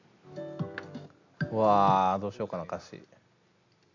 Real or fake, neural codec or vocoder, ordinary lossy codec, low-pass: real; none; AAC, 48 kbps; 7.2 kHz